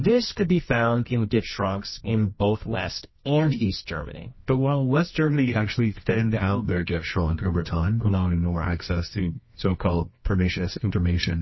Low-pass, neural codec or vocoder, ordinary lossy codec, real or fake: 7.2 kHz; codec, 24 kHz, 0.9 kbps, WavTokenizer, medium music audio release; MP3, 24 kbps; fake